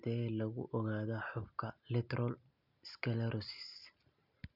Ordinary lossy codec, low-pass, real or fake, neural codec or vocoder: none; 5.4 kHz; real; none